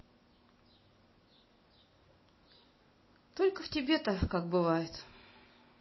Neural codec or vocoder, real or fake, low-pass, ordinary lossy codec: none; real; 7.2 kHz; MP3, 24 kbps